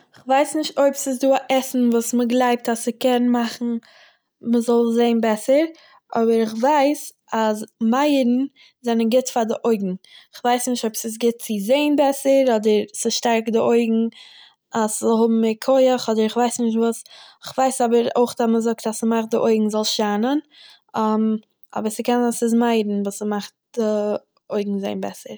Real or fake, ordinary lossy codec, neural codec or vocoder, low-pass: real; none; none; none